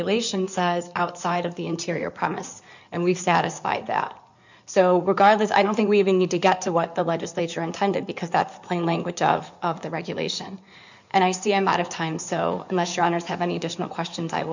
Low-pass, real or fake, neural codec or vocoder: 7.2 kHz; fake; codec, 16 kHz in and 24 kHz out, 2.2 kbps, FireRedTTS-2 codec